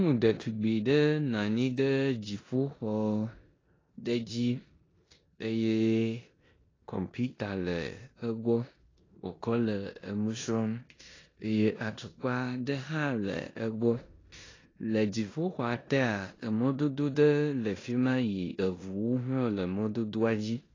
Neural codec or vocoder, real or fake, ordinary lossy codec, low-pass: codec, 16 kHz in and 24 kHz out, 0.9 kbps, LongCat-Audio-Codec, four codebook decoder; fake; AAC, 32 kbps; 7.2 kHz